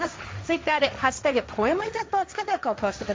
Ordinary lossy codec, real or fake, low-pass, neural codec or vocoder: none; fake; none; codec, 16 kHz, 1.1 kbps, Voila-Tokenizer